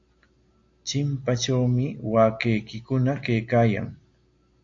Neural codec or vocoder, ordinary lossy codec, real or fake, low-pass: none; AAC, 48 kbps; real; 7.2 kHz